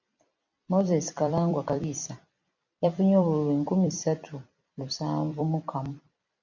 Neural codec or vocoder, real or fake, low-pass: vocoder, 44.1 kHz, 128 mel bands every 256 samples, BigVGAN v2; fake; 7.2 kHz